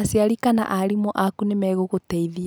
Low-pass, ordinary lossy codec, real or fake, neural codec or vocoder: none; none; real; none